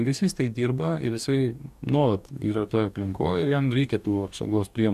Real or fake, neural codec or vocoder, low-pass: fake; codec, 44.1 kHz, 2.6 kbps, DAC; 14.4 kHz